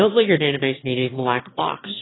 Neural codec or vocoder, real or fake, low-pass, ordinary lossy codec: autoencoder, 22.05 kHz, a latent of 192 numbers a frame, VITS, trained on one speaker; fake; 7.2 kHz; AAC, 16 kbps